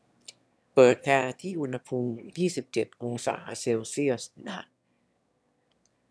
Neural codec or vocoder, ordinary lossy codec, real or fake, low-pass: autoencoder, 22.05 kHz, a latent of 192 numbers a frame, VITS, trained on one speaker; none; fake; none